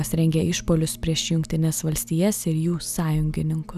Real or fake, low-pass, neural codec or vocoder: real; 14.4 kHz; none